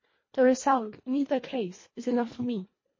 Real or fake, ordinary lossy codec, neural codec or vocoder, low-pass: fake; MP3, 32 kbps; codec, 24 kHz, 1.5 kbps, HILCodec; 7.2 kHz